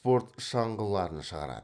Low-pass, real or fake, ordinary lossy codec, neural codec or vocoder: 9.9 kHz; real; none; none